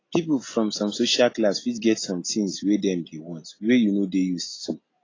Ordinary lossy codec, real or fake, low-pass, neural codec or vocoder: AAC, 32 kbps; real; 7.2 kHz; none